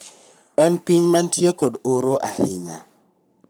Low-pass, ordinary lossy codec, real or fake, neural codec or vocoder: none; none; fake; codec, 44.1 kHz, 3.4 kbps, Pupu-Codec